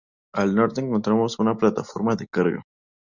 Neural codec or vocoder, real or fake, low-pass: none; real; 7.2 kHz